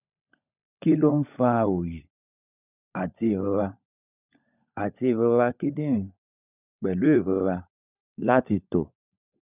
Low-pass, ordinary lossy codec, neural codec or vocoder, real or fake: 3.6 kHz; none; codec, 16 kHz, 16 kbps, FunCodec, trained on LibriTTS, 50 frames a second; fake